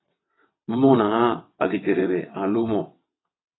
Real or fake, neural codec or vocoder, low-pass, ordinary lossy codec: fake; vocoder, 22.05 kHz, 80 mel bands, WaveNeXt; 7.2 kHz; AAC, 16 kbps